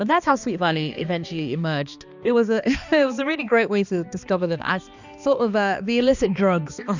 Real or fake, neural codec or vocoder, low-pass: fake; codec, 16 kHz, 2 kbps, X-Codec, HuBERT features, trained on balanced general audio; 7.2 kHz